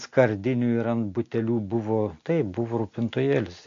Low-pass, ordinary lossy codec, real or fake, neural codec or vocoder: 7.2 kHz; MP3, 48 kbps; real; none